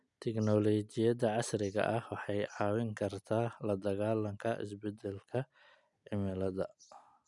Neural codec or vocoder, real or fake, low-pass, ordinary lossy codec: none; real; 10.8 kHz; none